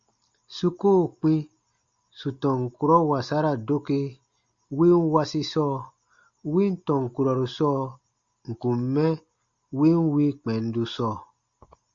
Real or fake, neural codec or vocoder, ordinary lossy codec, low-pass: real; none; Opus, 64 kbps; 7.2 kHz